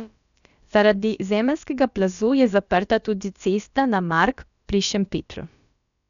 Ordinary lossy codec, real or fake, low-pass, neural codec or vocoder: none; fake; 7.2 kHz; codec, 16 kHz, about 1 kbps, DyCAST, with the encoder's durations